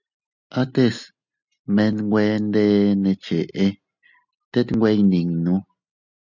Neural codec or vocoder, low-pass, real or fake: none; 7.2 kHz; real